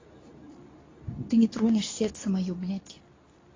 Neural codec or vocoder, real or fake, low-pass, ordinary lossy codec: codec, 24 kHz, 0.9 kbps, WavTokenizer, medium speech release version 2; fake; 7.2 kHz; AAC, 32 kbps